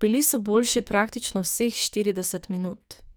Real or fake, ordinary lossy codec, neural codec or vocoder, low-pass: fake; none; codec, 44.1 kHz, 2.6 kbps, SNAC; none